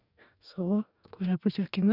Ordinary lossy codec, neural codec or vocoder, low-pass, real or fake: none; codec, 44.1 kHz, 2.6 kbps, DAC; 5.4 kHz; fake